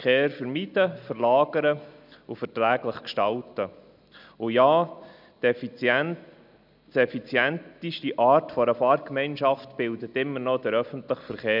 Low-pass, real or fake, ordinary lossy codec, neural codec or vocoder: 5.4 kHz; real; none; none